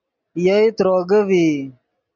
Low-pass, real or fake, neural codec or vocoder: 7.2 kHz; real; none